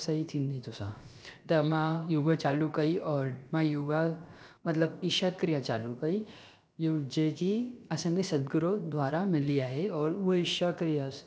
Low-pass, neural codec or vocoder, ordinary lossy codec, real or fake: none; codec, 16 kHz, about 1 kbps, DyCAST, with the encoder's durations; none; fake